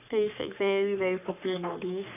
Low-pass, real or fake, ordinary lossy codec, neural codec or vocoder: 3.6 kHz; fake; none; codec, 44.1 kHz, 3.4 kbps, Pupu-Codec